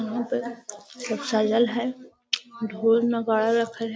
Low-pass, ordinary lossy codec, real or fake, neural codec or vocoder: none; none; real; none